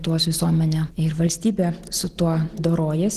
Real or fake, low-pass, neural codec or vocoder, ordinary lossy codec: real; 14.4 kHz; none; Opus, 16 kbps